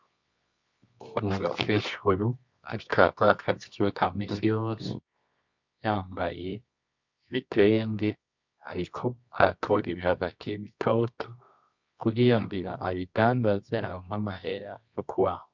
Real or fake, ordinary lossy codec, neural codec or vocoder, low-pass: fake; MP3, 64 kbps; codec, 24 kHz, 0.9 kbps, WavTokenizer, medium music audio release; 7.2 kHz